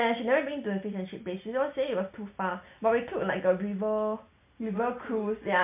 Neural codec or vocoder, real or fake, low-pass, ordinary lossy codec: none; real; 3.6 kHz; MP3, 32 kbps